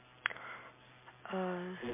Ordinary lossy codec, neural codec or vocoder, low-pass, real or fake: MP3, 24 kbps; none; 3.6 kHz; real